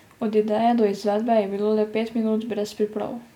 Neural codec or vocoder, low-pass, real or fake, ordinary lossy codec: none; 19.8 kHz; real; none